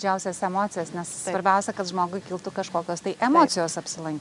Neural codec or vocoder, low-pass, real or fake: none; 10.8 kHz; real